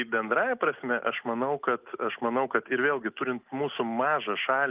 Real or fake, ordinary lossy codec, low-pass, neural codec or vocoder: real; Opus, 32 kbps; 3.6 kHz; none